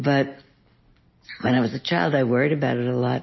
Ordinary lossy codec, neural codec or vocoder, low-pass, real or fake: MP3, 24 kbps; none; 7.2 kHz; real